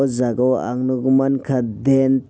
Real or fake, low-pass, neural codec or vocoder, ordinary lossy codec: real; none; none; none